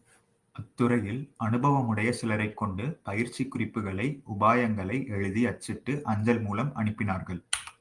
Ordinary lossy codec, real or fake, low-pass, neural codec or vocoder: Opus, 32 kbps; real; 10.8 kHz; none